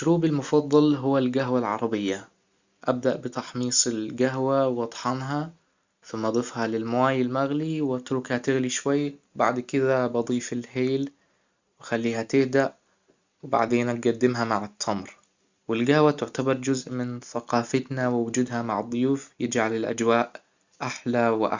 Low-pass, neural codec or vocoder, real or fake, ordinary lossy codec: 7.2 kHz; none; real; Opus, 64 kbps